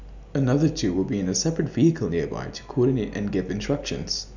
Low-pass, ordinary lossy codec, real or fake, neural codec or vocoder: 7.2 kHz; none; real; none